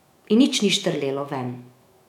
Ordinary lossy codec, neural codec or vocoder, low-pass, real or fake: none; autoencoder, 48 kHz, 128 numbers a frame, DAC-VAE, trained on Japanese speech; 19.8 kHz; fake